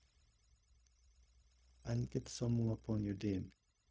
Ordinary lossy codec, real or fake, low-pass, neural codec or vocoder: none; fake; none; codec, 16 kHz, 0.4 kbps, LongCat-Audio-Codec